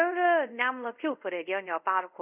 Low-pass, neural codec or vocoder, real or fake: 3.6 kHz; codec, 24 kHz, 0.5 kbps, DualCodec; fake